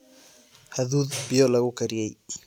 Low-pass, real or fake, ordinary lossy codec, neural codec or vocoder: 19.8 kHz; real; none; none